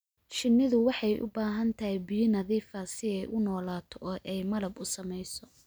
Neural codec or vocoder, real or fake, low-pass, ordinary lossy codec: none; real; none; none